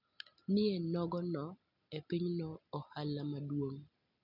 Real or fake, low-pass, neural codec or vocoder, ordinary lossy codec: real; 5.4 kHz; none; none